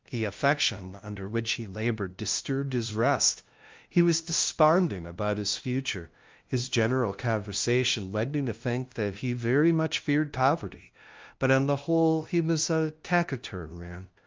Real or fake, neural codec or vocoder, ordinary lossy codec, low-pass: fake; codec, 16 kHz, 0.5 kbps, FunCodec, trained on LibriTTS, 25 frames a second; Opus, 32 kbps; 7.2 kHz